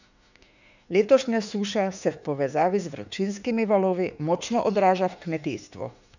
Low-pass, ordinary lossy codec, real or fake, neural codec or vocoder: 7.2 kHz; none; fake; autoencoder, 48 kHz, 32 numbers a frame, DAC-VAE, trained on Japanese speech